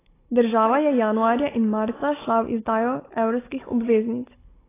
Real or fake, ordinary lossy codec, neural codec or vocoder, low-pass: fake; AAC, 16 kbps; codec, 16 kHz, 16 kbps, FunCodec, trained on Chinese and English, 50 frames a second; 3.6 kHz